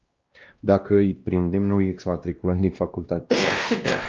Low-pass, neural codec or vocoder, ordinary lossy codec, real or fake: 7.2 kHz; codec, 16 kHz, 1 kbps, X-Codec, WavLM features, trained on Multilingual LibriSpeech; Opus, 24 kbps; fake